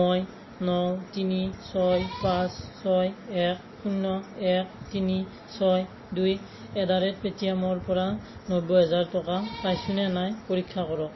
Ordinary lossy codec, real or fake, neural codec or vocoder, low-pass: MP3, 24 kbps; real; none; 7.2 kHz